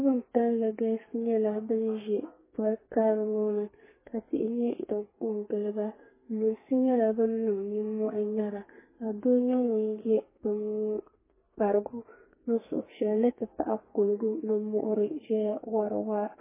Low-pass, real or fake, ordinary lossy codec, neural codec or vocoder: 3.6 kHz; fake; MP3, 16 kbps; codec, 32 kHz, 1.9 kbps, SNAC